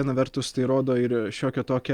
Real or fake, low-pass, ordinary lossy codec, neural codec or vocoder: real; 19.8 kHz; Opus, 32 kbps; none